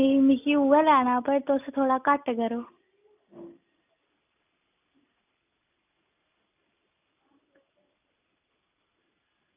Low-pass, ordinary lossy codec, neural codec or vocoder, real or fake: 3.6 kHz; none; none; real